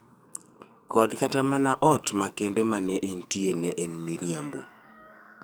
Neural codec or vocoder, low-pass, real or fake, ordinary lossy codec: codec, 44.1 kHz, 2.6 kbps, SNAC; none; fake; none